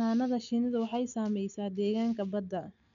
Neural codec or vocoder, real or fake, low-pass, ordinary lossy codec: none; real; 7.2 kHz; none